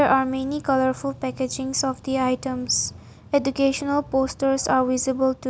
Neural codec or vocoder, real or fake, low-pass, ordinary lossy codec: none; real; none; none